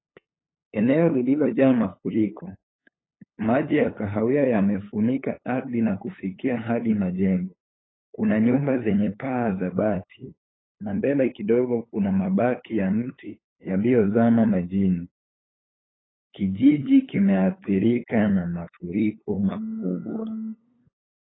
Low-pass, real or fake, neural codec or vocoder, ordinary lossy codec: 7.2 kHz; fake; codec, 16 kHz, 8 kbps, FunCodec, trained on LibriTTS, 25 frames a second; AAC, 16 kbps